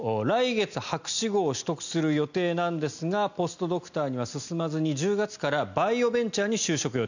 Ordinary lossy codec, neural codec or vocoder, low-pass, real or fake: none; none; 7.2 kHz; real